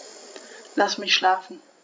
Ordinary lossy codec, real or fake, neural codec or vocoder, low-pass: none; fake; codec, 16 kHz, 16 kbps, FreqCodec, larger model; none